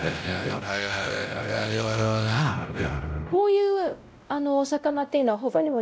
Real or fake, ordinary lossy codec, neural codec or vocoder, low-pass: fake; none; codec, 16 kHz, 0.5 kbps, X-Codec, WavLM features, trained on Multilingual LibriSpeech; none